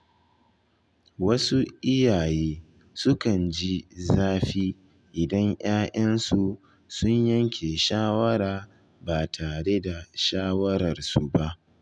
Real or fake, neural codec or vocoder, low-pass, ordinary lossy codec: real; none; none; none